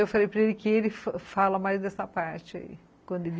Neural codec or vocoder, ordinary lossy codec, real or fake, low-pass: none; none; real; none